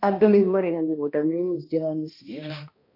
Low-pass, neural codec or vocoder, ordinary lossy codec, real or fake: 5.4 kHz; codec, 16 kHz, 1 kbps, X-Codec, HuBERT features, trained on balanced general audio; MP3, 32 kbps; fake